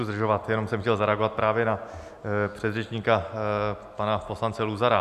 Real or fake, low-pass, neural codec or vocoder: real; 14.4 kHz; none